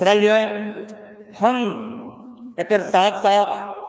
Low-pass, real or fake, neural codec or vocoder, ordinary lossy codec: none; fake; codec, 16 kHz, 1 kbps, FreqCodec, larger model; none